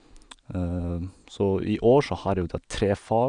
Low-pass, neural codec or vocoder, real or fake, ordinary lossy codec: 9.9 kHz; none; real; none